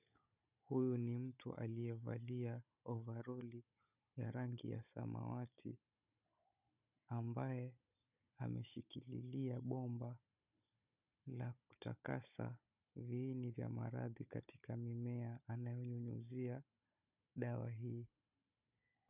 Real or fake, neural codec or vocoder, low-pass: fake; codec, 16 kHz, 16 kbps, FunCodec, trained on Chinese and English, 50 frames a second; 3.6 kHz